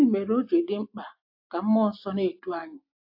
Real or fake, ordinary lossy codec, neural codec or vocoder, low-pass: real; AAC, 48 kbps; none; 5.4 kHz